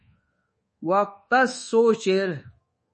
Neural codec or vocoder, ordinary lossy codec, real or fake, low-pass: codec, 24 kHz, 1.2 kbps, DualCodec; MP3, 32 kbps; fake; 10.8 kHz